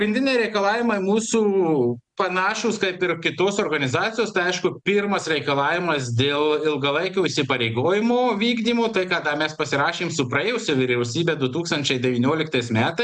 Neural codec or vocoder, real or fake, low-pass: none; real; 10.8 kHz